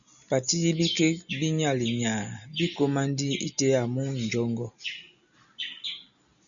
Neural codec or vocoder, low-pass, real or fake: none; 7.2 kHz; real